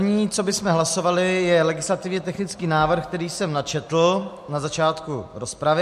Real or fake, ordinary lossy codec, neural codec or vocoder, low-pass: real; MP3, 64 kbps; none; 14.4 kHz